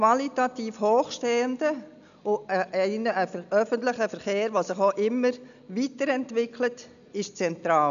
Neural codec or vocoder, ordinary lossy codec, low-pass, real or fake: none; none; 7.2 kHz; real